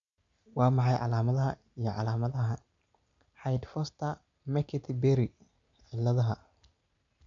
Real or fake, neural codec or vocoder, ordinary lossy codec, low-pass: real; none; MP3, 64 kbps; 7.2 kHz